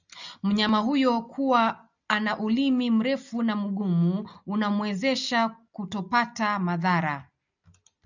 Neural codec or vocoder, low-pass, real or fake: none; 7.2 kHz; real